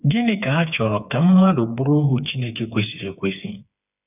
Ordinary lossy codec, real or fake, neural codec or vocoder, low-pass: none; fake; codec, 16 kHz, 4 kbps, FreqCodec, smaller model; 3.6 kHz